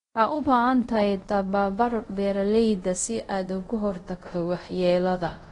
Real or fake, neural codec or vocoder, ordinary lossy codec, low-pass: fake; codec, 24 kHz, 0.5 kbps, DualCodec; AAC, 32 kbps; 10.8 kHz